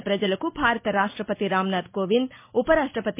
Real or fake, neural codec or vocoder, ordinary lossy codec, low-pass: real; none; MP3, 24 kbps; 3.6 kHz